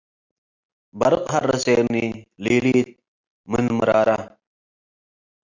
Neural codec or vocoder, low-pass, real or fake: none; 7.2 kHz; real